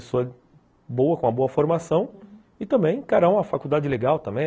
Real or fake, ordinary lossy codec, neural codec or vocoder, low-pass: real; none; none; none